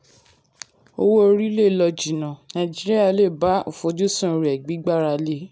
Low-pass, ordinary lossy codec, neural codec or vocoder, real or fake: none; none; none; real